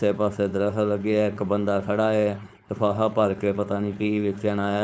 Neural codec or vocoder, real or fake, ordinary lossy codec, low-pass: codec, 16 kHz, 4.8 kbps, FACodec; fake; none; none